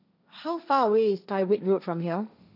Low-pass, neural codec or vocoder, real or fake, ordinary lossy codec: 5.4 kHz; codec, 16 kHz, 1.1 kbps, Voila-Tokenizer; fake; none